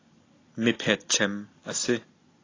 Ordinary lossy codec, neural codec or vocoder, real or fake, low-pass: AAC, 32 kbps; none; real; 7.2 kHz